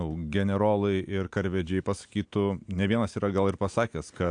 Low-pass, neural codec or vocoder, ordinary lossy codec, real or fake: 9.9 kHz; none; AAC, 64 kbps; real